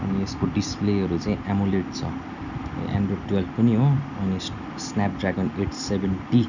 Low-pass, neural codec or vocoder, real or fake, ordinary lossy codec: 7.2 kHz; none; real; none